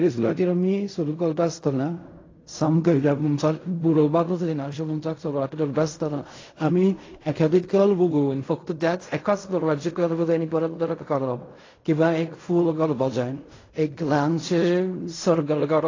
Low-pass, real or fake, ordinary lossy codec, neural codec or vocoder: 7.2 kHz; fake; AAC, 32 kbps; codec, 16 kHz in and 24 kHz out, 0.4 kbps, LongCat-Audio-Codec, fine tuned four codebook decoder